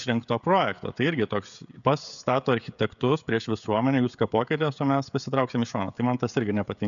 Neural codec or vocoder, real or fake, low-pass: codec, 16 kHz, 16 kbps, FreqCodec, smaller model; fake; 7.2 kHz